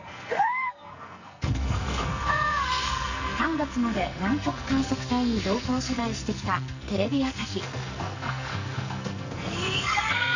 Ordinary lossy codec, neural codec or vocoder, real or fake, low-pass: none; codec, 44.1 kHz, 2.6 kbps, SNAC; fake; 7.2 kHz